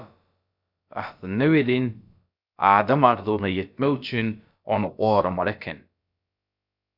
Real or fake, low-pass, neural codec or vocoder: fake; 5.4 kHz; codec, 16 kHz, about 1 kbps, DyCAST, with the encoder's durations